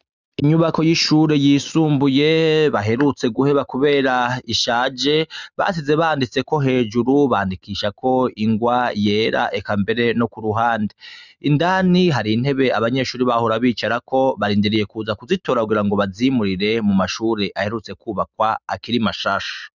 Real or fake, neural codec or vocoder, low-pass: real; none; 7.2 kHz